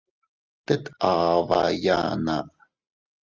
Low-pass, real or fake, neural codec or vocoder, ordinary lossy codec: 7.2 kHz; real; none; Opus, 24 kbps